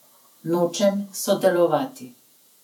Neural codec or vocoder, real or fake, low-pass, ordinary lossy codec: vocoder, 48 kHz, 128 mel bands, Vocos; fake; 19.8 kHz; none